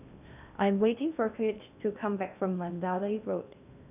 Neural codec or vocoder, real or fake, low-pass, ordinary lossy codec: codec, 16 kHz in and 24 kHz out, 0.6 kbps, FocalCodec, streaming, 2048 codes; fake; 3.6 kHz; none